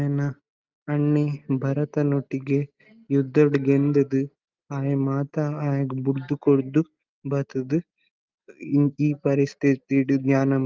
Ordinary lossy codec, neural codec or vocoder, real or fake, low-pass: Opus, 24 kbps; none; real; 7.2 kHz